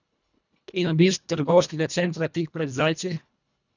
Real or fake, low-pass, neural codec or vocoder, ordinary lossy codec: fake; 7.2 kHz; codec, 24 kHz, 1.5 kbps, HILCodec; none